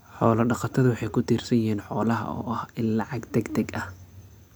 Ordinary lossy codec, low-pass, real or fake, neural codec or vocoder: none; none; real; none